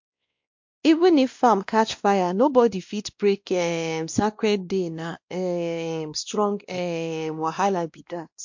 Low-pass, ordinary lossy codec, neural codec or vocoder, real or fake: 7.2 kHz; MP3, 48 kbps; codec, 16 kHz, 1 kbps, X-Codec, WavLM features, trained on Multilingual LibriSpeech; fake